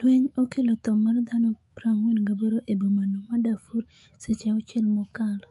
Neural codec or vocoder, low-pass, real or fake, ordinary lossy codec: autoencoder, 48 kHz, 128 numbers a frame, DAC-VAE, trained on Japanese speech; 14.4 kHz; fake; MP3, 48 kbps